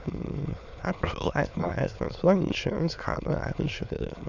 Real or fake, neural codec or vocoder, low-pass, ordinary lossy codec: fake; autoencoder, 22.05 kHz, a latent of 192 numbers a frame, VITS, trained on many speakers; 7.2 kHz; Opus, 64 kbps